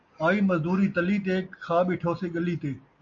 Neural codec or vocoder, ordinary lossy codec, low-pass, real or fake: none; AAC, 48 kbps; 7.2 kHz; real